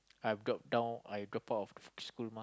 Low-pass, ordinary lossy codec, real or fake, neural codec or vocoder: none; none; real; none